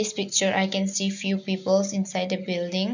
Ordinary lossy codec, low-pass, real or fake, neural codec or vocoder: none; 7.2 kHz; real; none